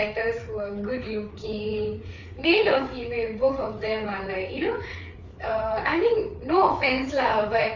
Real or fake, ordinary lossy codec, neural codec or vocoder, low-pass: fake; none; codec, 16 kHz, 4 kbps, FreqCodec, larger model; 7.2 kHz